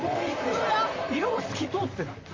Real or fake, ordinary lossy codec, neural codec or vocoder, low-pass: fake; Opus, 32 kbps; vocoder, 44.1 kHz, 128 mel bands, Pupu-Vocoder; 7.2 kHz